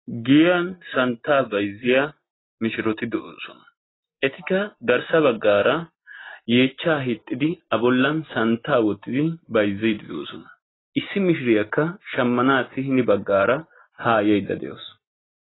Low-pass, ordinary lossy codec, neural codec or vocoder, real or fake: 7.2 kHz; AAC, 16 kbps; none; real